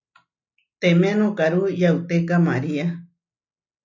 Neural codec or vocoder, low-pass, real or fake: none; 7.2 kHz; real